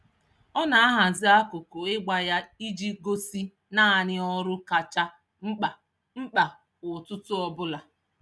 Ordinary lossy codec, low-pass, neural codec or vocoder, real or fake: none; none; none; real